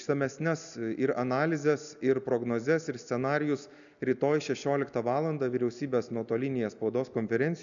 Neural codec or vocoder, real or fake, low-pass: none; real; 7.2 kHz